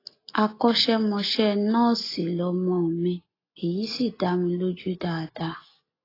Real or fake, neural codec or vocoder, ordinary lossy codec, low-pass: real; none; AAC, 24 kbps; 5.4 kHz